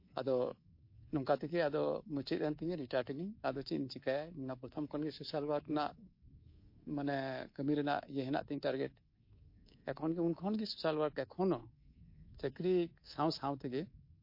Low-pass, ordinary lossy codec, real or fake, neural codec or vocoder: 5.4 kHz; MP3, 32 kbps; fake; codec, 24 kHz, 3.1 kbps, DualCodec